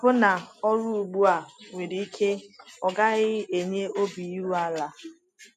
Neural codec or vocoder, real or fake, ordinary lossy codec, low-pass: none; real; none; 14.4 kHz